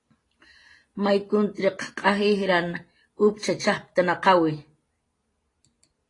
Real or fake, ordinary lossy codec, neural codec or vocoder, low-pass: real; AAC, 32 kbps; none; 10.8 kHz